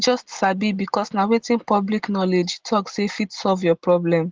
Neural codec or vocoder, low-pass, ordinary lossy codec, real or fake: none; 7.2 kHz; Opus, 16 kbps; real